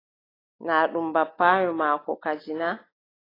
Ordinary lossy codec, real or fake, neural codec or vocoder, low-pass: AAC, 24 kbps; real; none; 5.4 kHz